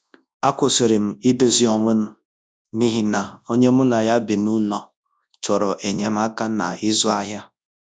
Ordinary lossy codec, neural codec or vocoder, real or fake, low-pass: none; codec, 24 kHz, 0.9 kbps, WavTokenizer, large speech release; fake; 9.9 kHz